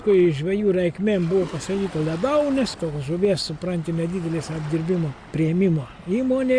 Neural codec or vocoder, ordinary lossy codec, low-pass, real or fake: none; Opus, 64 kbps; 9.9 kHz; real